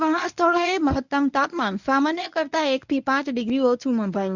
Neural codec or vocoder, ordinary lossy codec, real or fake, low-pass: codec, 24 kHz, 0.9 kbps, WavTokenizer, medium speech release version 1; none; fake; 7.2 kHz